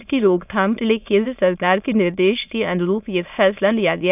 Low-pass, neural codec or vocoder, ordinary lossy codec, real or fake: 3.6 kHz; autoencoder, 22.05 kHz, a latent of 192 numbers a frame, VITS, trained on many speakers; none; fake